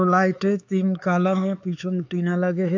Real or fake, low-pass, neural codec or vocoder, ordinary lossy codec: fake; 7.2 kHz; codec, 16 kHz, 4 kbps, X-Codec, HuBERT features, trained on LibriSpeech; AAC, 48 kbps